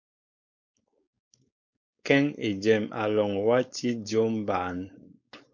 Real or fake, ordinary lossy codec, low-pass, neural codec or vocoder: fake; MP3, 48 kbps; 7.2 kHz; codec, 16 kHz, 4.8 kbps, FACodec